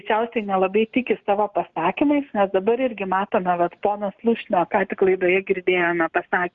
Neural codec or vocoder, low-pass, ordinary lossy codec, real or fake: none; 7.2 kHz; Opus, 64 kbps; real